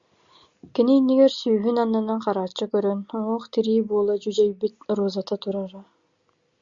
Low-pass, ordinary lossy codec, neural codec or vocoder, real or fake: 7.2 kHz; Opus, 64 kbps; none; real